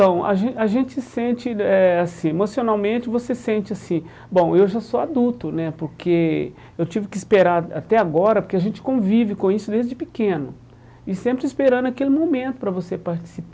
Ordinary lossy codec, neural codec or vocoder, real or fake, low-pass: none; none; real; none